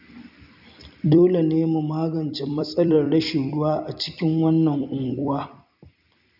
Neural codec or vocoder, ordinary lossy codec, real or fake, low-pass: vocoder, 44.1 kHz, 128 mel bands every 256 samples, BigVGAN v2; none; fake; 5.4 kHz